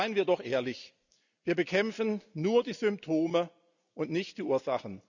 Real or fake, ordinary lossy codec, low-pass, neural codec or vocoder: real; none; 7.2 kHz; none